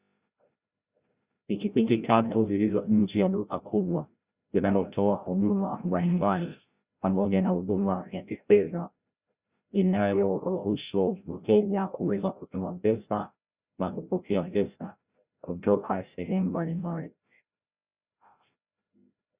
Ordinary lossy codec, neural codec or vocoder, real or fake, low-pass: Opus, 64 kbps; codec, 16 kHz, 0.5 kbps, FreqCodec, larger model; fake; 3.6 kHz